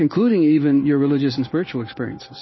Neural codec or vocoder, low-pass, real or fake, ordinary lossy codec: codec, 16 kHz in and 24 kHz out, 1 kbps, XY-Tokenizer; 7.2 kHz; fake; MP3, 24 kbps